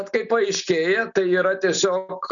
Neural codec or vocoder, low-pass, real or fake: none; 7.2 kHz; real